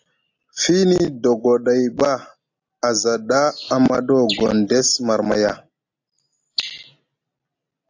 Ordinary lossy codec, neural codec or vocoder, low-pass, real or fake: AAC, 48 kbps; none; 7.2 kHz; real